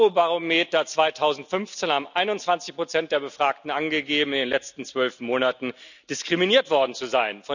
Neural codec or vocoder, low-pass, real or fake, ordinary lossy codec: none; 7.2 kHz; real; none